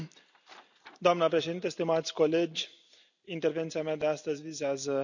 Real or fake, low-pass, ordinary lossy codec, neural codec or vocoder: real; 7.2 kHz; none; none